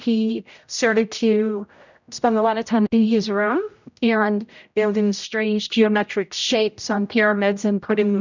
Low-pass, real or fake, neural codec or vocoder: 7.2 kHz; fake; codec, 16 kHz, 0.5 kbps, X-Codec, HuBERT features, trained on general audio